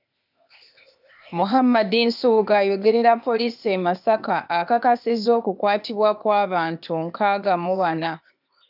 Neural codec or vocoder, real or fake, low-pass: codec, 16 kHz, 0.8 kbps, ZipCodec; fake; 5.4 kHz